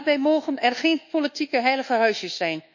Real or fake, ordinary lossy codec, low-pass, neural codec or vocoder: fake; none; 7.2 kHz; codec, 24 kHz, 1.2 kbps, DualCodec